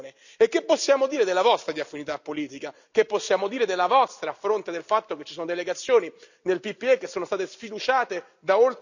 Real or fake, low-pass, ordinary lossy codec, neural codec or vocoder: real; 7.2 kHz; none; none